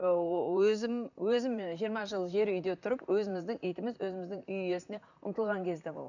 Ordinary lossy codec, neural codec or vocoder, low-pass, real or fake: none; vocoder, 44.1 kHz, 128 mel bands, Pupu-Vocoder; 7.2 kHz; fake